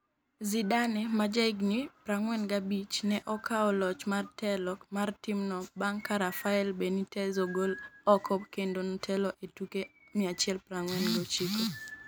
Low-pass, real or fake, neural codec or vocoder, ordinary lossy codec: none; real; none; none